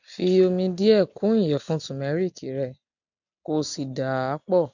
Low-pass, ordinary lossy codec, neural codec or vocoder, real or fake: 7.2 kHz; none; none; real